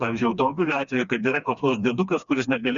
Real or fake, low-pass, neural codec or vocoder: fake; 7.2 kHz; codec, 16 kHz, 2 kbps, FreqCodec, smaller model